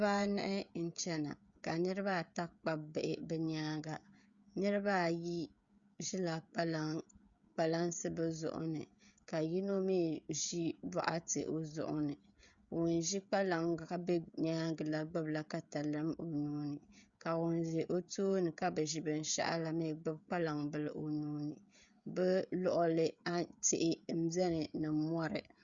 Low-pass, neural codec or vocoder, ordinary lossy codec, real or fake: 7.2 kHz; codec, 16 kHz, 16 kbps, FreqCodec, smaller model; Opus, 64 kbps; fake